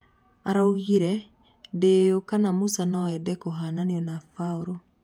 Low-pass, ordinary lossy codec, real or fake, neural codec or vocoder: 19.8 kHz; MP3, 96 kbps; fake; vocoder, 48 kHz, 128 mel bands, Vocos